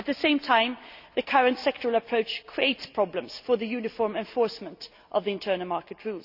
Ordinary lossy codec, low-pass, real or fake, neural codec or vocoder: Opus, 64 kbps; 5.4 kHz; real; none